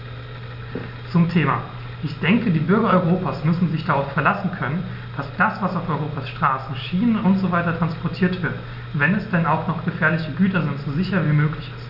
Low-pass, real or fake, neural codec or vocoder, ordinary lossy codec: 5.4 kHz; real; none; none